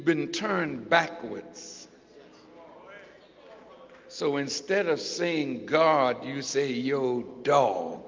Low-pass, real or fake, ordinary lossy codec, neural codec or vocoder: 7.2 kHz; real; Opus, 24 kbps; none